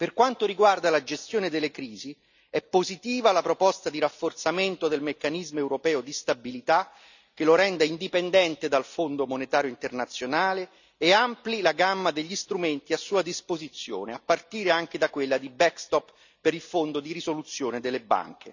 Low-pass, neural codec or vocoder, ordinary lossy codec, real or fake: 7.2 kHz; none; none; real